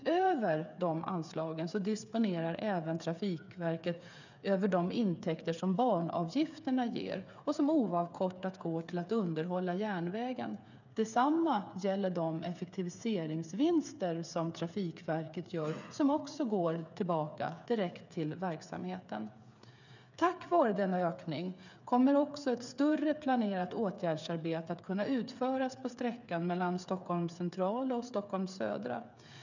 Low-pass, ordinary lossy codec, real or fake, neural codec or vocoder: 7.2 kHz; none; fake; codec, 16 kHz, 8 kbps, FreqCodec, smaller model